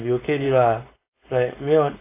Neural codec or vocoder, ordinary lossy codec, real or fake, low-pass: codec, 16 kHz, 4.8 kbps, FACodec; AAC, 24 kbps; fake; 3.6 kHz